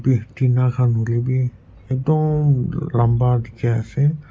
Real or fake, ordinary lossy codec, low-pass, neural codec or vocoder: real; none; none; none